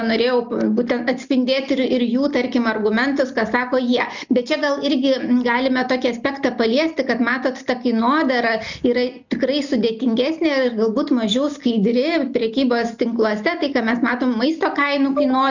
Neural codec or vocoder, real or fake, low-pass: none; real; 7.2 kHz